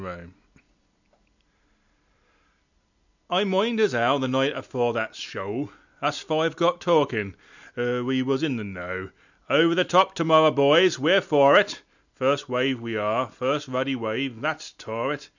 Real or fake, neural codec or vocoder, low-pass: real; none; 7.2 kHz